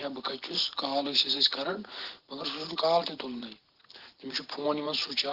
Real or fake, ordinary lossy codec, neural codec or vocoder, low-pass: real; Opus, 16 kbps; none; 5.4 kHz